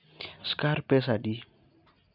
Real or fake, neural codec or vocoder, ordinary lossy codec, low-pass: real; none; none; 5.4 kHz